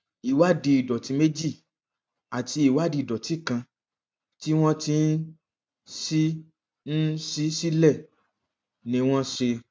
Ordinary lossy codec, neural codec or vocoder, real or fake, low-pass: none; none; real; none